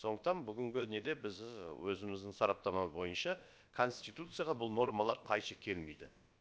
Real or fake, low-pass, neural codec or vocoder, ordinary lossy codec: fake; none; codec, 16 kHz, about 1 kbps, DyCAST, with the encoder's durations; none